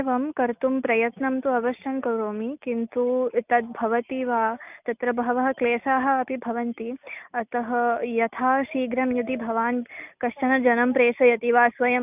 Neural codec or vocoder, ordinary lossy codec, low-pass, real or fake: none; none; 3.6 kHz; real